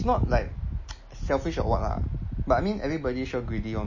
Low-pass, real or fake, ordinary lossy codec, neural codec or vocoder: 7.2 kHz; real; MP3, 32 kbps; none